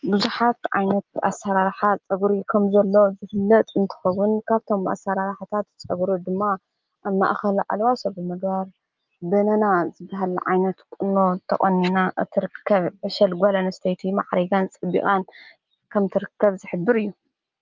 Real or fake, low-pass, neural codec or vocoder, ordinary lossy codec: real; 7.2 kHz; none; Opus, 32 kbps